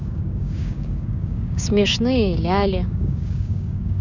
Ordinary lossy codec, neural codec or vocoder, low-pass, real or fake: none; codec, 16 kHz, 6 kbps, DAC; 7.2 kHz; fake